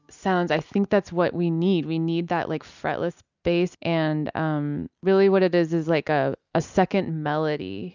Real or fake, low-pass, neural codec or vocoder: real; 7.2 kHz; none